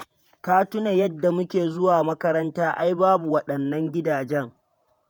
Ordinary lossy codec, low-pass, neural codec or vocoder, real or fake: none; none; vocoder, 48 kHz, 128 mel bands, Vocos; fake